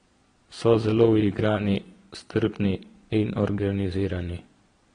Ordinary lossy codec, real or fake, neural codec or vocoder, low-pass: AAC, 32 kbps; fake; vocoder, 22.05 kHz, 80 mel bands, Vocos; 9.9 kHz